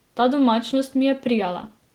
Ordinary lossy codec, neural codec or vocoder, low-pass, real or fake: Opus, 16 kbps; none; 19.8 kHz; real